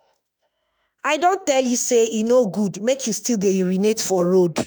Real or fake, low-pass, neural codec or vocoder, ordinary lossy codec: fake; none; autoencoder, 48 kHz, 32 numbers a frame, DAC-VAE, trained on Japanese speech; none